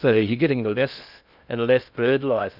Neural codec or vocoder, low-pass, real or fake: codec, 16 kHz in and 24 kHz out, 0.6 kbps, FocalCodec, streaming, 2048 codes; 5.4 kHz; fake